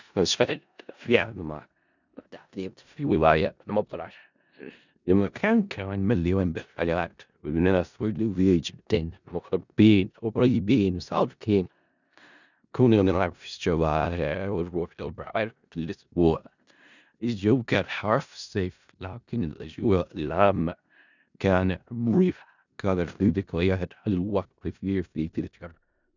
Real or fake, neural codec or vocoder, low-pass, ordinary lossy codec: fake; codec, 16 kHz in and 24 kHz out, 0.4 kbps, LongCat-Audio-Codec, four codebook decoder; 7.2 kHz; none